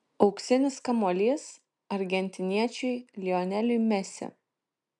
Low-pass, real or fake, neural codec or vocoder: 10.8 kHz; real; none